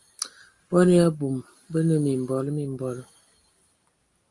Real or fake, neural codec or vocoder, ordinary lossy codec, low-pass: real; none; Opus, 32 kbps; 10.8 kHz